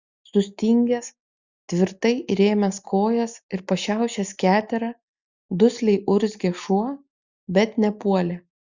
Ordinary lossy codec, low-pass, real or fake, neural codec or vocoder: Opus, 64 kbps; 7.2 kHz; real; none